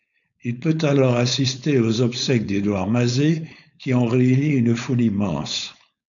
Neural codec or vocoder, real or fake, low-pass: codec, 16 kHz, 4.8 kbps, FACodec; fake; 7.2 kHz